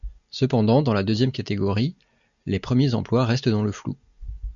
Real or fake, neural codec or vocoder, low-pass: real; none; 7.2 kHz